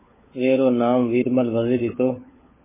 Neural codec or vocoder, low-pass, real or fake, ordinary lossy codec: codec, 16 kHz, 16 kbps, FunCodec, trained on LibriTTS, 50 frames a second; 3.6 kHz; fake; MP3, 16 kbps